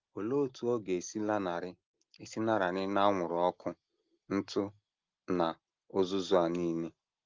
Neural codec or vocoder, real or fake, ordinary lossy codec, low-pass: none; real; Opus, 32 kbps; 7.2 kHz